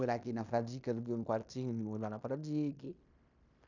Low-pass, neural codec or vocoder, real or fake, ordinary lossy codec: 7.2 kHz; codec, 16 kHz in and 24 kHz out, 0.9 kbps, LongCat-Audio-Codec, fine tuned four codebook decoder; fake; none